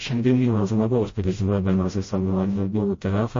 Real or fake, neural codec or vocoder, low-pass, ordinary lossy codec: fake; codec, 16 kHz, 0.5 kbps, FreqCodec, smaller model; 7.2 kHz; MP3, 32 kbps